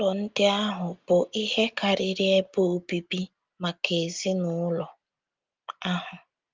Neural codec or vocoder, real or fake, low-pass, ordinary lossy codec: none; real; 7.2 kHz; Opus, 32 kbps